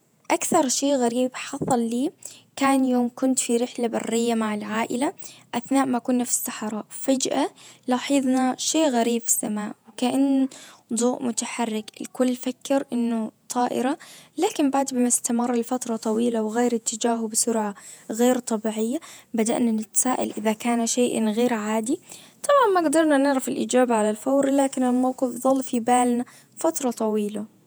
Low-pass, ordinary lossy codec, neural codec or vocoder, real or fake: none; none; vocoder, 48 kHz, 128 mel bands, Vocos; fake